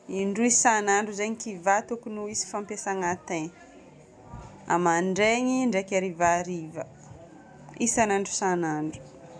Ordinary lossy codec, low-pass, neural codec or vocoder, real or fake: none; none; none; real